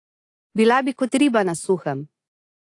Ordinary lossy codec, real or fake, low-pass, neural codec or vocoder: AAC, 48 kbps; real; 10.8 kHz; none